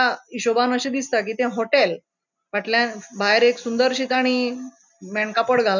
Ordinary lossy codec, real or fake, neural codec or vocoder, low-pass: none; real; none; 7.2 kHz